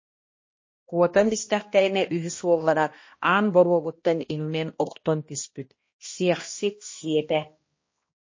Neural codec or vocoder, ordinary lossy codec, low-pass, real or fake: codec, 16 kHz, 1 kbps, X-Codec, HuBERT features, trained on balanced general audio; MP3, 32 kbps; 7.2 kHz; fake